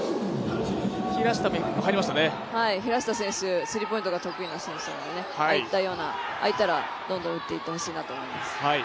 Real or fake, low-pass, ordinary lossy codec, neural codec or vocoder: real; none; none; none